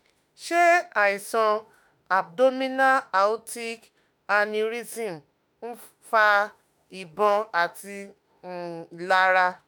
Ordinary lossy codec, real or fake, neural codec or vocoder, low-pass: none; fake; autoencoder, 48 kHz, 32 numbers a frame, DAC-VAE, trained on Japanese speech; none